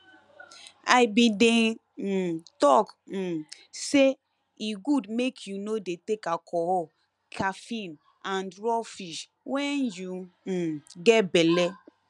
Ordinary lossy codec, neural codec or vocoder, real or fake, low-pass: none; none; real; 10.8 kHz